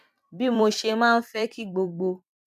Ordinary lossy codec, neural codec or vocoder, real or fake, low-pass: none; vocoder, 44.1 kHz, 128 mel bands every 256 samples, BigVGAN v2; fake; 14.4 kHz